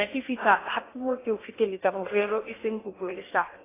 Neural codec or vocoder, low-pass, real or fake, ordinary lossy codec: codec, 16 kHz in and 24 kHz out, 0.8 kbps, FocalCodec, streaming, 65536 codes; 3.6 kHz; fake; AAC, 16 kbps